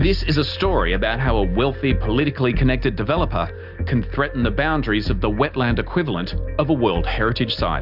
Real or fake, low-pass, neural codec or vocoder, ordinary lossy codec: real; 5.4 kHz; none; Opus, 64 kbps